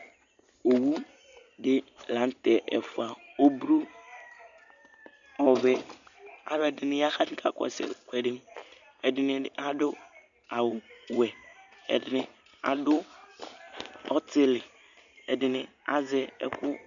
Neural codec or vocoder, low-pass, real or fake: none; 7.2 kHz; real